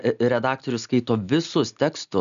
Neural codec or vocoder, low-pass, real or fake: none; 7.2 kHz; real